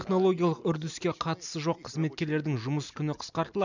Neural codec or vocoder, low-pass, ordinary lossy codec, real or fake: none; 7.2 kHz; none; real